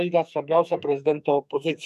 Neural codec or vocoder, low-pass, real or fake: codec, 44.1 kHz, 2.6 kbps, SNAC; 14.4 kHz; fake